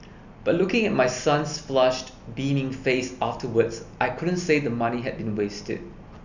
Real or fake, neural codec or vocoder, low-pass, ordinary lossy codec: real; none; 7.2 kHz; none